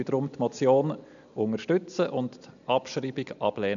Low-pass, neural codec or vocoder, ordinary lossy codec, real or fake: 7.2 kHz; none; none; real